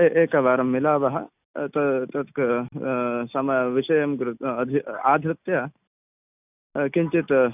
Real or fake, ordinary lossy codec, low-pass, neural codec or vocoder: real; none; 3.6 kHz; none